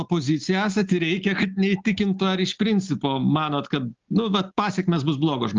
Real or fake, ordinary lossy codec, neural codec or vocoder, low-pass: real; Opus, 24 kbps; none; 7.2 kHz